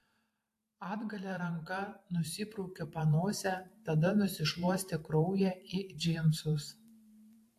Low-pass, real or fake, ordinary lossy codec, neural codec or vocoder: 14.4 kHz; fake; AAC, 64 kbps; vocoder, 44.1 kHz, 128 mel bands every 512 samples, BigVGAN v2